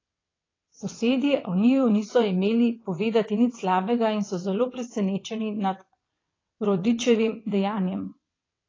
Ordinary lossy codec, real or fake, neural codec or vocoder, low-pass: AAC, 32 kbps; fake; vocoder, 22.05 kHz, 80 mel bands, WaveNeXt; 7.2 kHz